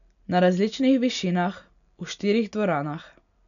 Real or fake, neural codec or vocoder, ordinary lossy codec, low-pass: real; none; none; 7.2 kHz